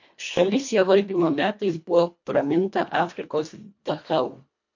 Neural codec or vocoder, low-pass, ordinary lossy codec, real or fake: codec, 24 kHz, 1.5 kbps, HILCodec; 7.2 kHz; MP3, 48 kbps; fake